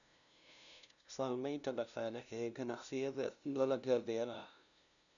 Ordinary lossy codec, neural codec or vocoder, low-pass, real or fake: none; codec, 16 kHz, 0.5 kbps, FunCodec, trained on LibriTTS, 25 frames a second; 7.2 kHz; fake